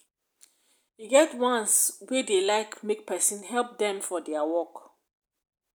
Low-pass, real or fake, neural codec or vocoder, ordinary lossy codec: none; real; none; none